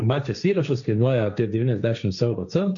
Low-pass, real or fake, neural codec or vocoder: 7.2 kHz; fake; codec, 16 kHz, 1.1 kbps, Voila-Tokenizer